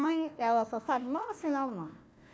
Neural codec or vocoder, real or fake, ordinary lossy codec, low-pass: codec, 16 kHz, 1 kbps, FunCodec, trained on Chinese and English, 50 frames a second; fake; none; none